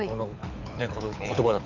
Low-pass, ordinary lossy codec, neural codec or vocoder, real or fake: 7.2 kHz; none; codec, 24 kHz, 6 kbps, HILCodec; fake